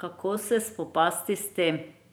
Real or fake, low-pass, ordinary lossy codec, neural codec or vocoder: fake; none; none; vocoder, 44.1 kHz, 128 mel bands every 512 samples, BigVGAN v2